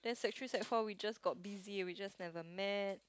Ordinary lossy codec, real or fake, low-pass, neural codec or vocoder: none; real; none; none